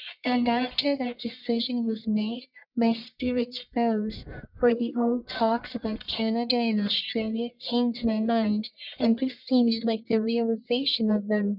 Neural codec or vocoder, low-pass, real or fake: codec, 44.1 kHz, 1.7 kbps, Pupu-Codec; 5.4 kHz; fake